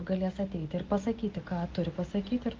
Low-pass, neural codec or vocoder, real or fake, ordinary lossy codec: 7.2 kHz; none; real; Opus, 24 kbps